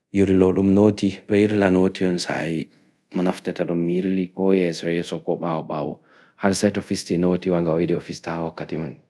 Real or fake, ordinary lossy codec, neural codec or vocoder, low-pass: fake; none; codec, 24 kHz, 0.5 kbps, DualCodec; none